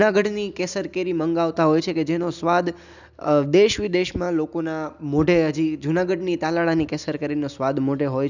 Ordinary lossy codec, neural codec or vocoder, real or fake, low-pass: none; none; real; 7.2 kHz